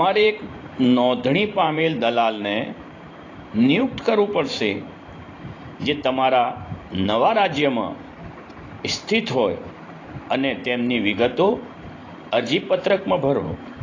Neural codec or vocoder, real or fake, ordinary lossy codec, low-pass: vocoder, 44.1 kHz, 128 mel bands every 512 samples, BigVGAN v2; fake; AAC, 32 kbps; 7.2 kHz